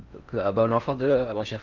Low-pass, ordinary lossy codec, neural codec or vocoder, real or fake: 7.2 kHz; Opus, 24 kbps; codec, 16 kHz in and 24 kHz out, 0.6 kbps, FocalCodec, streaming, 4096 codes; fake